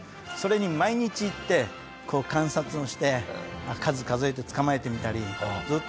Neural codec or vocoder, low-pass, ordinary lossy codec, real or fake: none; none; none; real